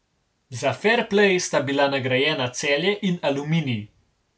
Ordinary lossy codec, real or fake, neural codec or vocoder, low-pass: none; real; none; none